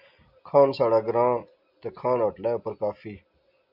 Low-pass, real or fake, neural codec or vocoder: 5.4 kHz; real; none